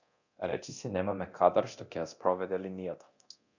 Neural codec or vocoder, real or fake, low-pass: codec, 24 kHz, 0.9 kbps, DualCodec; fake; 7.2 kHz